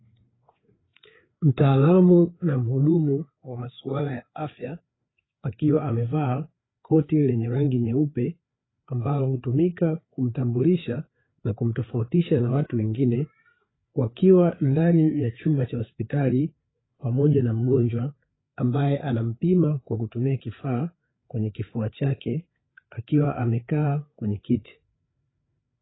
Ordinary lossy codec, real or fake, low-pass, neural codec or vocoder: AAC, 16 kbps; fake; 7.2 kHz; codec, 16 kHz, 4 kbps, FreqCodec, larger model